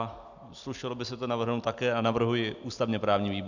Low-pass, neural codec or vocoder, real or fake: 7.2 kHz; none; real